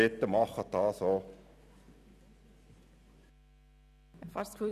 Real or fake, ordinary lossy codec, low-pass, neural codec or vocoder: real; none; 14.4 kHz; none